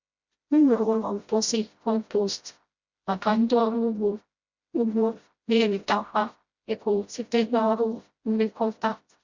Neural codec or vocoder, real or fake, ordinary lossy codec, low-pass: codec, 16 kHz, 0.5 kbps, FreqCodec, smaller model; fake; Opus, 64 kbps; 7.2 kHz